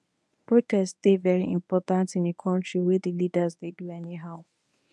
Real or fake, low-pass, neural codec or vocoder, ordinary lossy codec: fake; none; codec, 24 kHz, 0.9 kbps, WavTokenizer, medium speech release version 1; none